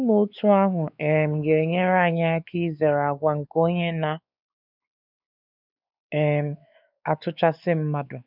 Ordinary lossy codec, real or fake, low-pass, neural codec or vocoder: none; fake; 5.4 kHz; codec, 16 kHz, 4 kbps, X-Codec, WavLM features, trained on Multilingual LibriSpeech